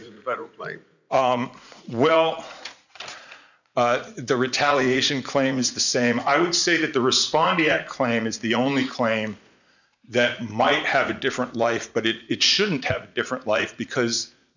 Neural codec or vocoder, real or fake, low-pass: vocoder, 44.1 kHz, 80 mel bands, Vocos; fake; 7.2 kHz